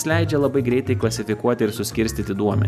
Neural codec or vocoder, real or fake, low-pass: none; real; 14.4 kHz